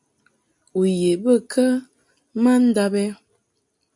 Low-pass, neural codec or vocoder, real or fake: 10.8 kHz; none; real